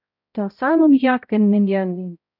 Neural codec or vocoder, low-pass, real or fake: codec, 16 kHz, 0.5 kbps, X-Codec, HuBERT features, trained on balanced general audio; 5.4 kHz; fake